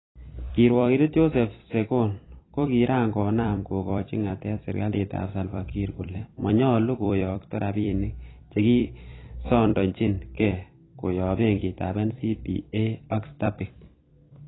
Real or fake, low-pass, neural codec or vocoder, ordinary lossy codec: fake; 7.2 kHz; vocoder, 44.1 kHz, 128 mel bands every 256 samples, BigVGAN v2; AAC, 16 kbps